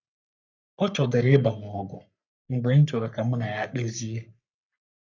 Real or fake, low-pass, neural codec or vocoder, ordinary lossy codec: fake; 7.2 kHz; codec, 44.1 kHz, 3.4 kbps, Pupu-Codec; none